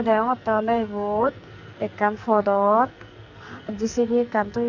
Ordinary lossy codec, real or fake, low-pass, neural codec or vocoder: none; fake; 7.2 kHz; codec, 44.1 kHz, 2.6 kbps, SNAC